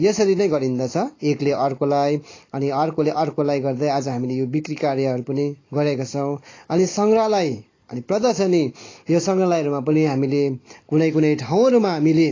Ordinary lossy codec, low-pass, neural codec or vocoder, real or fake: AAC, 32 kbps; 7.2 kHz; none; real